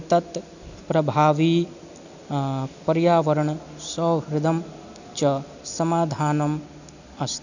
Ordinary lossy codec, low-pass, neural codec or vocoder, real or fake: none; 7.2 kHz; none; real